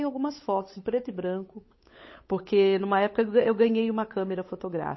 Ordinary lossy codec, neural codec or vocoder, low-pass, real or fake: MP3, 24 kbps; codec, 16 kHz, 8 kbps, FunCodec, trained on Chinese and English, 25 frames a second; 7.2 kHz; fake